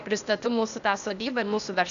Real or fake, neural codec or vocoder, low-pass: fake; codec, 16 kHz, 0.8 kbps, ZipCodec; 7.2 kHz